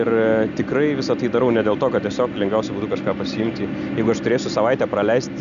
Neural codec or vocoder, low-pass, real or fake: none; 7.2 kHz; real